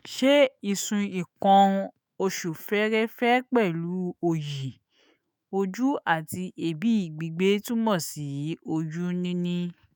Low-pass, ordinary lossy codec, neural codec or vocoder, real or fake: none; none; autoencoder, 48 kHz, 128 numbers a frame, DAC-VAE, trained on Japanese speech; fake